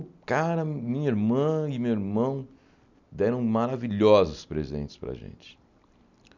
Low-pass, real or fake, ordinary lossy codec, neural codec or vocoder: 7.2 kHz; real; none; none